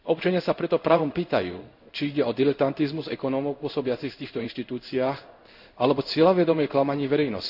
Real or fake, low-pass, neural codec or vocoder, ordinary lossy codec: fake; 5.4 kHz; codec, 16 kHz in and 24 kHz out, 1 kbps, XY-Tokenizer; none